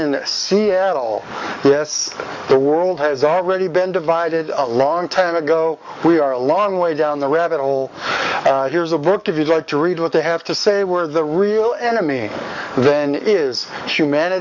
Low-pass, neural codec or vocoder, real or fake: 7.2 kHz; codec, 44.1 kHz, 7.8 kbps, DAC; fake